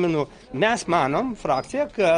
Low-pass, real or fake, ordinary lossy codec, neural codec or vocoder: 9.9 kHz; real; Opus, 24 kbps; none